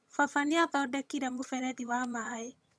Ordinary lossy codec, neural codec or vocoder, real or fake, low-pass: none; vocoder, 22.05 kHz, 80 mel bands, HiFi-GAN; fake; none